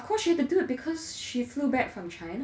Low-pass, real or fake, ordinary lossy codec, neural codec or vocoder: none; real; none; none